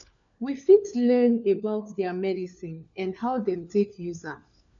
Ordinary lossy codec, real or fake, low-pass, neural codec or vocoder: none; fake; 7.2 kHz; codec, 16 kHz, 4 kbps, FunCodec, trained on LibriTTS, 50 frames a second